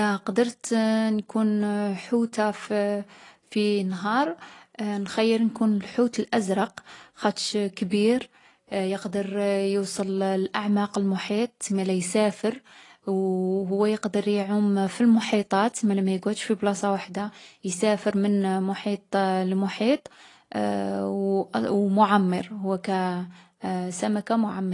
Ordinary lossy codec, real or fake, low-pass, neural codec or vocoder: AAC, 32 kbps; real; 10.8 kHz; none